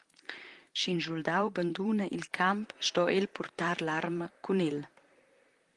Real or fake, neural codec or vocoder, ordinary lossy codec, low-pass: fake; vocoder, 44.1 kHz, 128 mel bands, Pupu-Vocoder; Opus, 24 kbps; 10.8 kHz